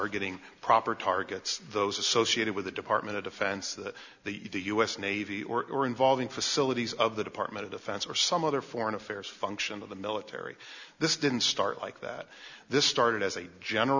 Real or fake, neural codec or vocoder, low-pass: real; none; 7.2 kHz